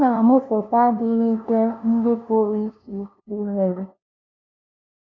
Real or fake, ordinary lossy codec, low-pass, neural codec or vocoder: fake; none; 7.2 kHz; codec, 16 kHz, 0.5 kbps, FunCodec, trained on LibriTTS, 25 frames a second